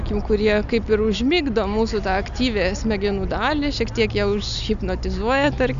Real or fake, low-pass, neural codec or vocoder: real; 7.2 kHz; none